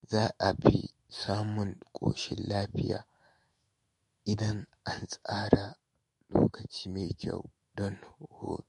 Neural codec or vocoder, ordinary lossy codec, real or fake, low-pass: autoencoder, 48 kHz, 128 numbers a frame, DAC-VAE, trained on Japanese speech; MP3, 48 kbps; fake; 14.4 kHz